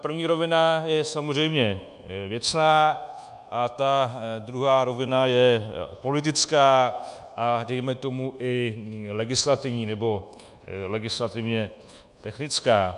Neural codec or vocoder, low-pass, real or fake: codec, 24 kHz, 1.2 kbps, DualCodec; 10.8 kHz; fake